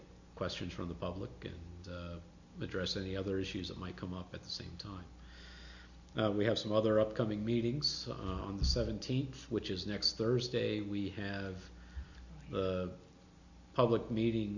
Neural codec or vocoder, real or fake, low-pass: none; real; 7.2 kHz